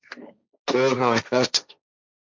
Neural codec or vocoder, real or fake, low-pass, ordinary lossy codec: codec, 16 kHz, 1.1 kbps, Voila-Tokenizer; fake; 7.2 kHz; MP3, 64 kbps